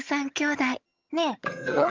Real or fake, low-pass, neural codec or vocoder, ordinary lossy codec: fake; 7.2 kHz; vocoder, 22.05 kHz, 80 mel bands, HiFi-GAN; Opus, 24 kbps